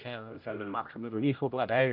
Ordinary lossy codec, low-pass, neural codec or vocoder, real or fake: none; 5.4 kHz; codec, 16 kHz, 0.5 kbps, X-Codec, HuBERT features, trained on general audio; fake